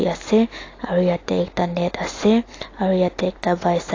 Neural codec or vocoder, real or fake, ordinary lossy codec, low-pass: none; real; AAC, 32 kbps; 7.2 kHz